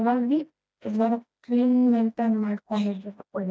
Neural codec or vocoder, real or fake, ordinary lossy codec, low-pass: codec, 16 kHz, 1 kbps, FreqCodec, smaller model; fake; none; none